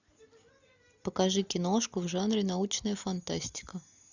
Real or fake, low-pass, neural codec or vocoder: real; 7.2 kHz; none